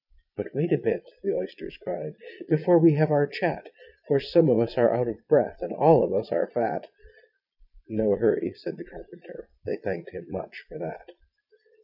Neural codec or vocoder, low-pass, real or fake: vocoder, 22.05 kHz, 80 mel bands, Vocos; 5.4 kHz; fake